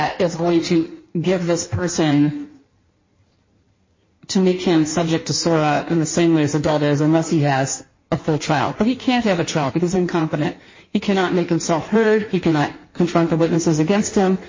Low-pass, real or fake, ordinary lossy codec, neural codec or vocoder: 7.2 kHz; fake; MP3, 32 kbps; codec, 16 kHz in and 24 kHz out, 1.1 kbps, FireRedTTS-2 codec